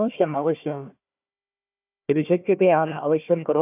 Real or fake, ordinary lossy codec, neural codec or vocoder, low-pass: fake; none; codec, 16 kHz, 1 kbps, FunCodec, trained on Chinese and English, 50 frames a second; 3.6 kHz